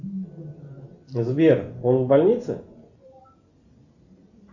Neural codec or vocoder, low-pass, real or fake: none; 7.2 kHz; real